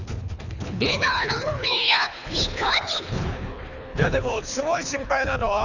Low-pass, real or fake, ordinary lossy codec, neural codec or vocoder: 7.2 kHz; fake; none; codec, 24 kHz, 3 kbps, HILCodec